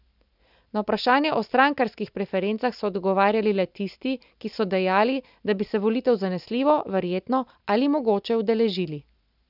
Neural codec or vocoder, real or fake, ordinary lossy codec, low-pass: none; real; none; 5.4 kHz